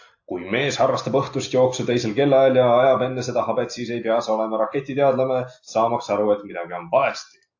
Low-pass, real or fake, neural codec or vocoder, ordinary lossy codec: 7.2 kHz; real; none; AAC, 48 kbps